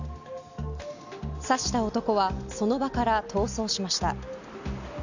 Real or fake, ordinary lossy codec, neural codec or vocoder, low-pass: real; none; none; 7.2 kHz